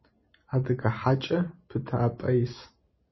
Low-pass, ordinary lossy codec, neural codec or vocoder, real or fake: 7.2 kHz; MP3, 24 kbps; none; real